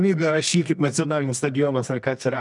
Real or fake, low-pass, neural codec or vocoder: fake; 10.8 kHz; codec, 24 kHz, 0.9 kbps, WavTokenizer, medium music audio release